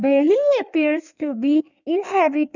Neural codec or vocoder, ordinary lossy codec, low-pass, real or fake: codec, 16 kHz in and 24 kHz out, 1.1 kbps, FireRedTTS-2 codec; none; 7.2 kHz; fake